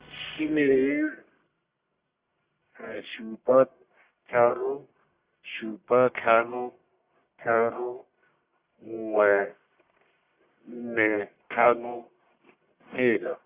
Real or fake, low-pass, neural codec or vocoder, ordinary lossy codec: fake; 3.6 kHz; codec, 44.1 kHz, 1.7 kbps, Pupu-Codec; Opus, 64 kbps